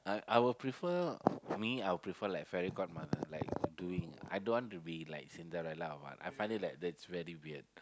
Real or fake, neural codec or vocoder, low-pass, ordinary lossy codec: real; none; none; none